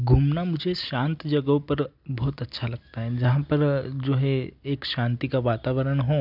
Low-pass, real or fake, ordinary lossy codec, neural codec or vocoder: 5.4 kHz; real; none; none